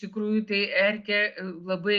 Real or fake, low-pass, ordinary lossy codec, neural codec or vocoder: real; 7.2 kHz; Opus, 32 kbps; none